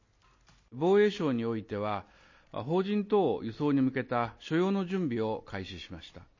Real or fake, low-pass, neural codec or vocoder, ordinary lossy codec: real; 7.2 kHz; none; MP3, 32 kbps